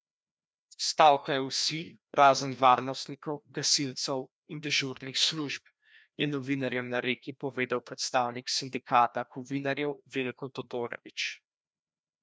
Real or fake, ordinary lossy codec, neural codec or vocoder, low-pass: fake; none; codec, 16 kHz, 1 kbps, FreqCodec, larger model; none